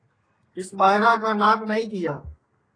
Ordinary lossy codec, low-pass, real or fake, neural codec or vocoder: AAC, 32 kbps; 9.9 kHz; fake; codec, 32 kHz, 1.9 kbps, SNAC